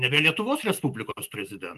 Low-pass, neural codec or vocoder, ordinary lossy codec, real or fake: 14.4 kHz; none; Opus, 32 kbps; real